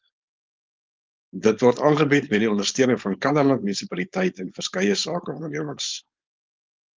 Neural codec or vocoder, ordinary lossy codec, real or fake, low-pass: codec, 16 kHz, 4.8 kbps, FACodec; Opus, 32 kbps; fake; 7.2 kHz